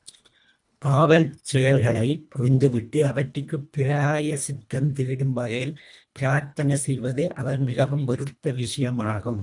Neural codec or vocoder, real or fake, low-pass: codec, 24 kHz, 1.5 kbps, HILCodec; fake; 10.8 kHz